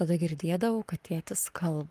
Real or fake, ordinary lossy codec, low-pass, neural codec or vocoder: fake; Opus, 32 kbps; 14.4 kHz; codec, 44.1 kHz, 7.8 kbps, Pupu-Codec